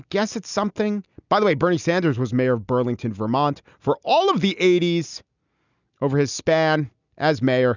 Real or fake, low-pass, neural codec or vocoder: real; 7.2 kHz; none